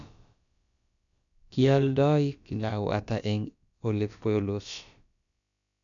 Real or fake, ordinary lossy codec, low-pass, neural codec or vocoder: fake; none; 7.2 kHz; codec, 16 kHz, about 1 kbps, DyCAST, with the encoder's durations